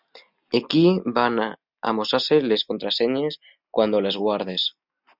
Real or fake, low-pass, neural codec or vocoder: real; 5.4 kHz; none